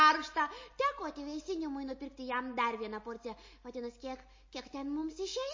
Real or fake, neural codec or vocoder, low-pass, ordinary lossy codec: real; none; 7.2 kHz; MP3, 32 kbps